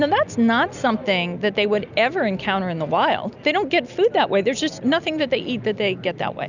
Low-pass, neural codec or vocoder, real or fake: 7.2 kHz; none; real